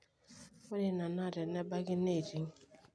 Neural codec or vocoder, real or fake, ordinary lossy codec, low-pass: none; real; none; none